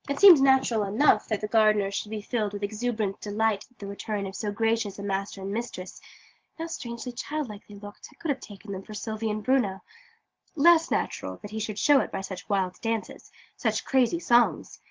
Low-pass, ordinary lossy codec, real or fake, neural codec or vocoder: 7.2 kHz; Opus, 16 kbps; real; none